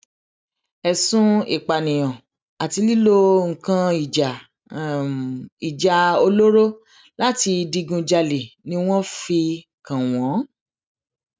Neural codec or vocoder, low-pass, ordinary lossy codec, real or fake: none; none; none; real